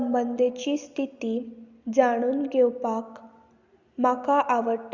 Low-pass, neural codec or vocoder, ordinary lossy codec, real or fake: 7.2 kHz; none; Opus, 64 kbps; real